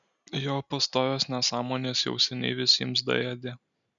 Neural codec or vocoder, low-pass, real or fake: none; 7.2 kHz; real